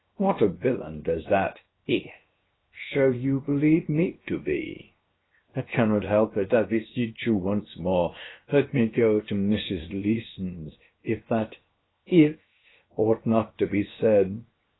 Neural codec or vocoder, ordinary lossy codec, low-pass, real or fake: codec, 16 kHz, 0.7 kbps, FocalCodec; AAC, 16 kbps; 7.2 kHz; fake